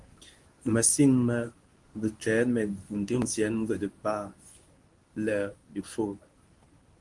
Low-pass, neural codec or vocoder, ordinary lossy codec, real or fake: 10.8 kHz; codec, 24 kHz, 0.9 kbps, WavTokenizer, medium speech release version 1; Opus, 24 kbps; fake